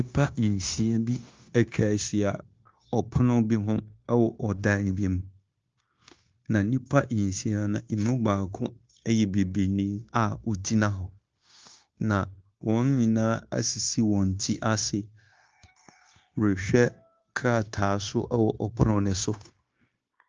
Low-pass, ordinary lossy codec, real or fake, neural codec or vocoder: 7.2 kHz; Opus, 24 kbps; fake; codec, 16 kHz, 0.9 kbps, LongCat-Audio-Codec